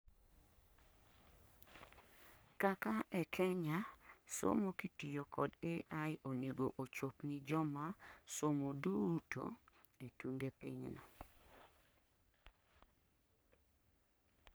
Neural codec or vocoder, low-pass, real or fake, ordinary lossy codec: codec, 44.1 kHz, 3.4 kbps, Pupu-Codec; none; fake; none